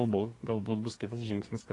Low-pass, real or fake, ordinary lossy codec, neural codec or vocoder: 10.8 kHz; fake; AAC, 32 kbps; codec, 24 kHz, 1 kbps, SNAC